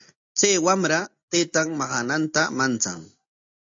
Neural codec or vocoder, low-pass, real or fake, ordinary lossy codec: none; 7.2 kHz; real; AAC, 48 kbps